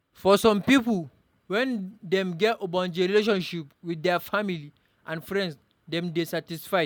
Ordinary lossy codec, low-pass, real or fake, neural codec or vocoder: none; 19.8 kHz; real; none